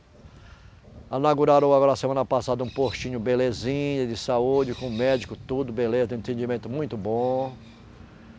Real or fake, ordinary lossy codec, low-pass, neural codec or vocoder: real; none; none; none